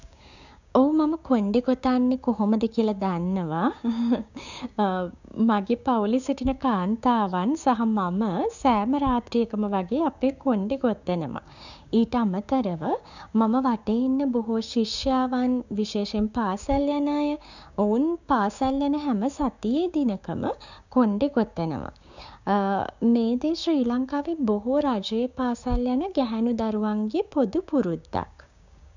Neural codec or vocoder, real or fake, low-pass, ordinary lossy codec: codec, 16 kHz, 6 kbps, DAC; fake; 7.2 kHz; none